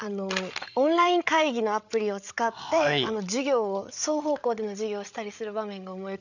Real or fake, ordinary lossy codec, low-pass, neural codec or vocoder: fake; none; 7.2 kHz; codec, 16 kHz, 8 kbps, FreqCodec, larger model